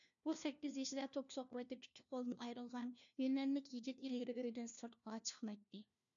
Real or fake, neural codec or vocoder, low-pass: fake; codec, 16 kHz, 1 kbps, FunCodec, trained on LibriTTS, 50 frames a second; 7.2 kHz